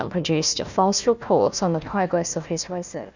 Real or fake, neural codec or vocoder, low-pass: fake; codec, 16 kHz, 1 kbps, FunCodec, trained on Chinese and English, 50 frames a second; 7.2 kHz